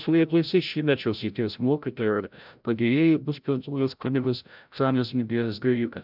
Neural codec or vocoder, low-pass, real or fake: codec, 16 kHz, 0.5 kbps, FreqCodec, larger model; 5.4 kHz; fake